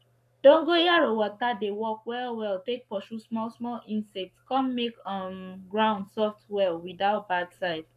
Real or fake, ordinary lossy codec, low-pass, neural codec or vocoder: fake; none; 14.4 kHz; codec, 44.1 kHz, 7.8 kbps, Pupu-Codec